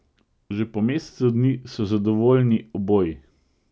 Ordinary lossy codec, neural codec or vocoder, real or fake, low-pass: none; none; real; none